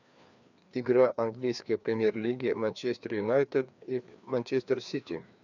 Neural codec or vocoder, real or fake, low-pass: codec, 16 kHz, 2 kbps, FreqCodec, larger model; fake; 7.2 kHz